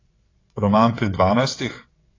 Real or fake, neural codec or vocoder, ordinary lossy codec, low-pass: fake; vocoder, 22.05 kHz, 80 mel bands, WaveNeXt; AAC, 32 kbps; 7.2 kHz